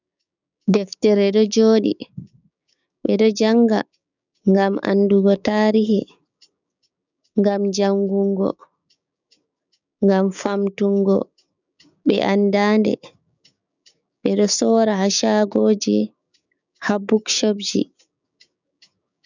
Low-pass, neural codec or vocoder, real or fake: 7.2 kHz; codec, 16 kHz, 6 kbps, DAC; fake